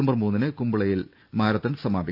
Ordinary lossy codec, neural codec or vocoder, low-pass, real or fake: none; none; 5.4 kHz; real